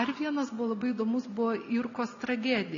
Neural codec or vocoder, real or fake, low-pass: none; real; 7.2 kHz